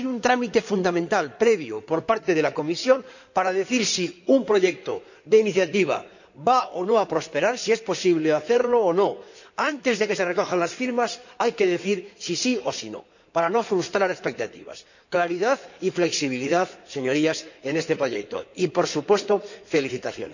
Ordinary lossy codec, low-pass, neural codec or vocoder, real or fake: none; 7.2 kHz; codec, 16 kHz in and 24 kHz out, 2.2 kbps, FireRedTTS-2 codec; fake